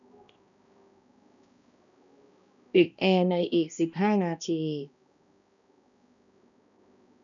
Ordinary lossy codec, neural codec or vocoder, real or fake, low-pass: none; codec, 16 kHz, 1 kbps, X-Codec, HuBERT features, trained on balanced general audio; fake; 7.2 kHz